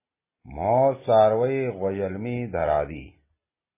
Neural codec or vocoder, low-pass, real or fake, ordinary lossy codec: none; 3.6 kHz; real; MP3, 16 kbps